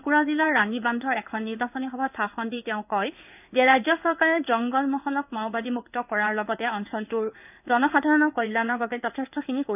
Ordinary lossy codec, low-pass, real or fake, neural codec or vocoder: none; 3.6 kHz; fake; codec, 16 kHz in and 24 kHz out, 1 kbps, XY-Tokenizer